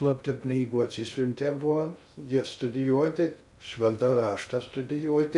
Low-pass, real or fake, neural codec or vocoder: 10.8 kHz; fake; codec, 16 kHz in and 24 kHz out, 0.6 kbps, FocalCodec, streaming, 2048 codes